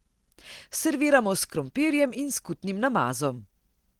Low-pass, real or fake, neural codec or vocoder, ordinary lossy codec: 19.8 kHz; real; none; Opus, 16 kbps